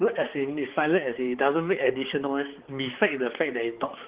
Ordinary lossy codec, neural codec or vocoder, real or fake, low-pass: Opus, 16 kbps; codec, 16 kHz, 4 kbps, X-Codec, HuBERT features, trained on balanced general audio; fake; 3.6 kHz